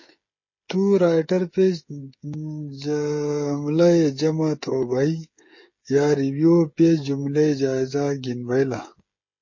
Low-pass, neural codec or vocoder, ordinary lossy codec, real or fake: 7.2 kHz; codec, 16 kHz, 16 kbps, FreqCodec, smaller model; MP3, 32 kbps; fake